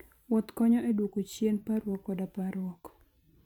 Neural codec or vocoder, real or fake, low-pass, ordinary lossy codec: vocoder, 44.1 kHz, 128 mel bands every 512 samples, BigVGAN v2; fake; 19.8 kHz; none